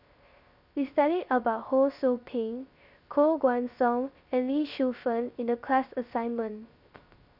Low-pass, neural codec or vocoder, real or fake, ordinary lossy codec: 5.4 kHz; codec, 16 kHz, 0.3 kbps, FocalCodec; fake; none